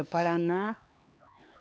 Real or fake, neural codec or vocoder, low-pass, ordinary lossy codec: fake; codec, 16 kHz, 4 kbps, X-Codec, HuBERT features, trained on LibriSpeech; none; none